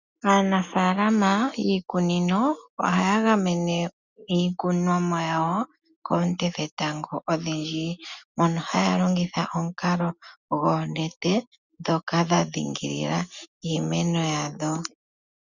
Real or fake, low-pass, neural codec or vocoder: real; 7.2 kHz; none